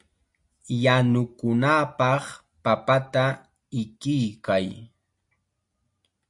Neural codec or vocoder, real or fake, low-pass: none; real; 10.8 kHz